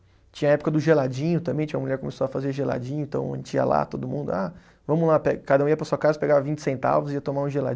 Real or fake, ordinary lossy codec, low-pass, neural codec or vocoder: real; none; none; none